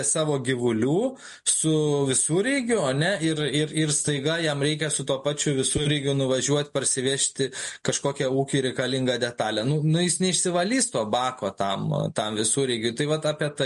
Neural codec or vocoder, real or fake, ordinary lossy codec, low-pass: none; real; MP3, 48 kbps; 14.4 kHz